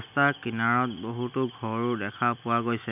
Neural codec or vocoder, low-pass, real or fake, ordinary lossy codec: none; 3.6 kHz; real; none